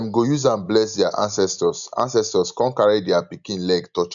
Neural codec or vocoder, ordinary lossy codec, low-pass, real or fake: none; none; 7.2 kHz; real